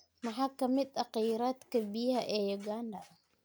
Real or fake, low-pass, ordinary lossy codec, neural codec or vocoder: real; none; none; none